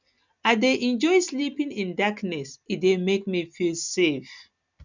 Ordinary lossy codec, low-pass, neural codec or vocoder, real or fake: none; 7.2 kHz; none; real